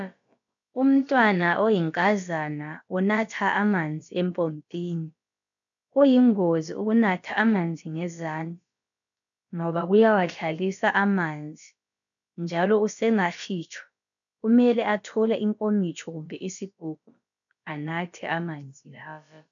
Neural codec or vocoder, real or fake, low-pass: codec, 16 kHz, about 1 kbps, DyCAST, with the encoder's durations; fake; 7.2 kHz